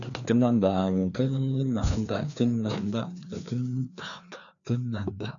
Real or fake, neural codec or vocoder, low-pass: fake; codec, 16 kHz, 2 kbps, FreqCodec, larger model; 7.2 kHz